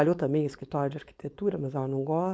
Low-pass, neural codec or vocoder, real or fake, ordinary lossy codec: none; codec, 16 kHz, 4.8 kbps, FACodec; fake; none